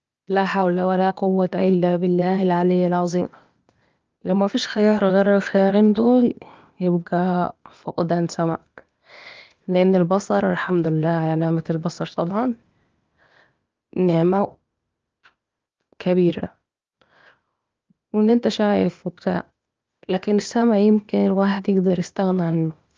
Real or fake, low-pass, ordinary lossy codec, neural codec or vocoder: fake; 7.2 kHz; Opus, 24 kbps; codec, 16 kHz, 0.8 kbps, ZipCodec